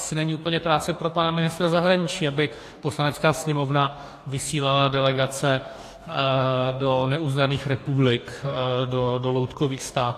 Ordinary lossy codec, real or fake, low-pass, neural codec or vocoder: AAC, 64 kbps; fake; 14.4 kHz; codec, 44.1 kHz, 2.6 kbps, DAC